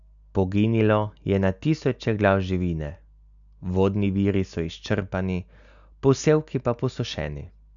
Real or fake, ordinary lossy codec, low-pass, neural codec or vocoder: real; none; 7.2 kHz; none